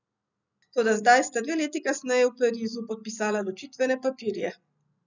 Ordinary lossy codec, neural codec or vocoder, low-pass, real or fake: none; none; 7.2 kHz; real